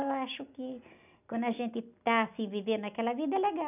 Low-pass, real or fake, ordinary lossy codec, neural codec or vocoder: 3.6 kHz; real; none; none